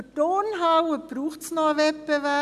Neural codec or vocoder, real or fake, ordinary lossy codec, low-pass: none; real; none; 14.4 kHz